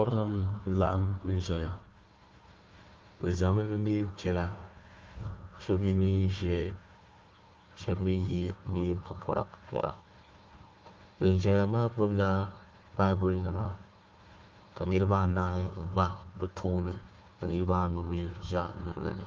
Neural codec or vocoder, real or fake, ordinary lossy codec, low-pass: codec, 16 kHz, 1 kbps, FunCodec, trained on Chinese and English, 50 frames a second; fake; Opus, 32 kbps; 7.2 kHz